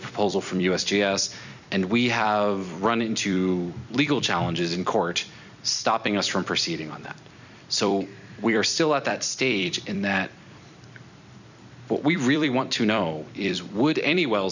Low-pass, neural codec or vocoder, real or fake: 7.2 kHz; vocoder, 44.1 kHz, 128 mel bands every 256 samples, BigVGAN v2; fake